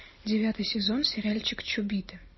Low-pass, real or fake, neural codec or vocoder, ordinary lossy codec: 7.2 kHz; real; none; MP3, 24 kbps